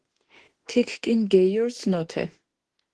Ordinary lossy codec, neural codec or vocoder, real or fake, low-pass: Opus, 16 kbps; autoencoder, 48 kHz, 32 numbers a frame, DAC-VAE, trained on Japanese speech; fake; 10.8 kHz